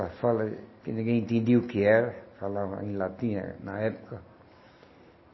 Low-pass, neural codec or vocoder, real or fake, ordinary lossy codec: 7.2 kHz; none; real; MP3, 24 kbps